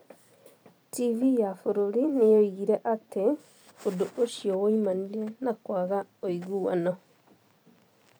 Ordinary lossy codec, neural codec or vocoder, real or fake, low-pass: none; vocoder, 44.1 kHz, 128 mel bands every 256 samples, BigVGAN v2; fake; none